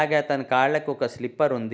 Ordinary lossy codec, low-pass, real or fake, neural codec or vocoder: none; none; real; none